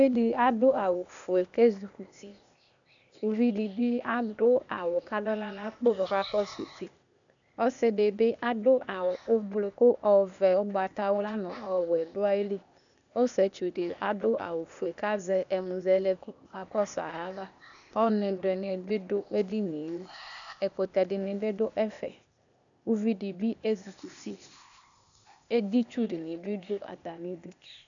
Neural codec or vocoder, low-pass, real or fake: codec, 16 kHz, 0.8 kbps, ZipCodec; 7.2 kHz; fake